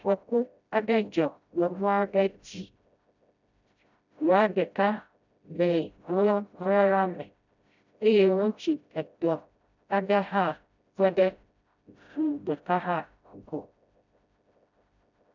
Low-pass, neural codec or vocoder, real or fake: 7.2 kHz; codec, 16 kHz, 0.5 kbps, FreqCodec, smaller model; fake